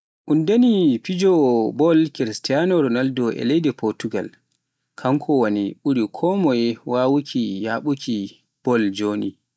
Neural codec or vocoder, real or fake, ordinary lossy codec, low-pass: none; real; none; none